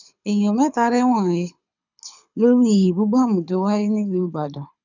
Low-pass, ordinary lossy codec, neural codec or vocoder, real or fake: 7.2 kHz; none; codec, 24 kHz, 6 kbps, HILCodec; fake